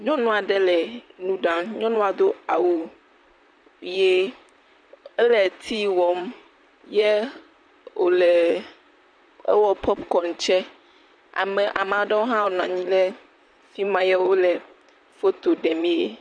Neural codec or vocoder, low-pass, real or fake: vocoder, 44.1 kHz, 128 mel bands, Pupu-Vocoder; 9.9 kHz; fake